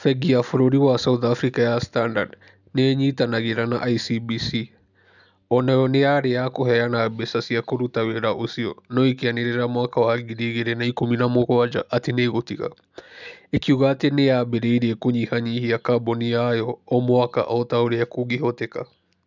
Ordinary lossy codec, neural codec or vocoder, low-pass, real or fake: none; none; 7.2 kHz; real